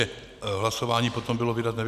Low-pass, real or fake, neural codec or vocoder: 14.4 kHz; real; none